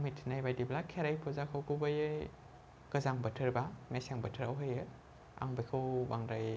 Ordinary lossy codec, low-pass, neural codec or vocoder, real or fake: none; none; none; real